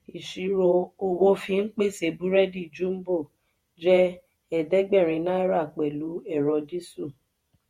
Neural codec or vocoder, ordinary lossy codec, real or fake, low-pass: vocoder, 48 kHz, 128 mel bands, Vocos; MP3, 64 kbps; fake; 19.8 kHz